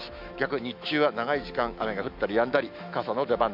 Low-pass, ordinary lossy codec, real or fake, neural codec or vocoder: 5.4 kHz; MP3, 48 kbps; fake; autoencoder, 48 kHz, 128 numbers a frame, DAC-VAE, trained on Japanese speech